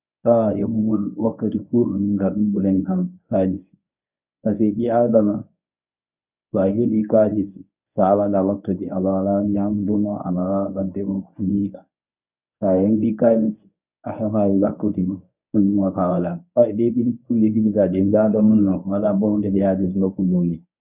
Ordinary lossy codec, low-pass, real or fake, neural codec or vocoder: none; 3.6 kHz; fake; codec, 24 kHz, 0.9 kbps, WavTokenizer, medium speech release version 1